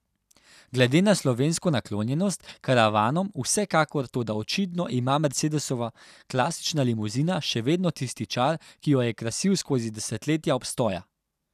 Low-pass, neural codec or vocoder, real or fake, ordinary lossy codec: 14.4 kHz; none; real; none